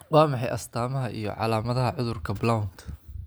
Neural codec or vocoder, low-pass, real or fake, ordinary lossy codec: none; none; real; none